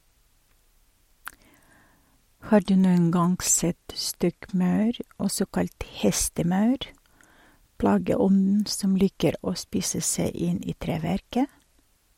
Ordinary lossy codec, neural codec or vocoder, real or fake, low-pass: MP3, 64 kbps; none; real; 19.8 kHz